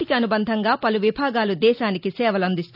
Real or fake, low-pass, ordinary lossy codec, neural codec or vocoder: real; 5.4 kHz; none; none